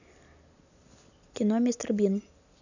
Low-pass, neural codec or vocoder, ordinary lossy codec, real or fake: 7.2 kHz; none; none; real